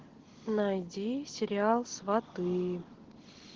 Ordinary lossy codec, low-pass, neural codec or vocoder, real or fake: Opus, 16 kbps; 7.2 kHz; none; real